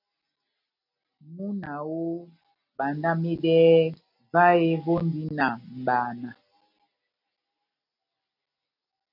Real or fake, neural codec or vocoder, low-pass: real; none; 5.4 kHz